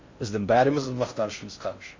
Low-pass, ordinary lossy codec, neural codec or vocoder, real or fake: 7.2 kHz; MP3, 48 kbps; codec, 16 kHz in and 24 kHz out, 0.6 kbps, FocalCodec, streaming, 4096 codes; fake